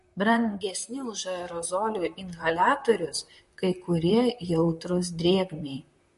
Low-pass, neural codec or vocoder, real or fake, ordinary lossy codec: 14.4 kHz; vocoder, 44.1 kHz, 128 mel bands, Pupu-Vocoder; fake; MP3, 48 kbps